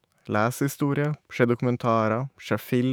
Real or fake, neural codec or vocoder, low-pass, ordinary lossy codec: fake; autoencoder, 48 kHz, 128 numbers a frame, DAC-VAE, trained on Japanese speech; none; none